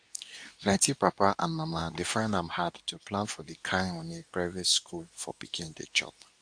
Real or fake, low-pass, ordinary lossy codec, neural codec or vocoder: fake; 9.9 kHz; AAC, 64 kbps; codec, 24 kHz, 0.9 kbps, WavTokenizer, medium speech release version 2